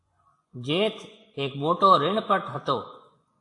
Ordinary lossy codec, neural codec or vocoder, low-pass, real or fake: AAC, 48 kbps; vocoder, 24 kHz, 100 mel bands, Vocos; 10.8 kHz; fake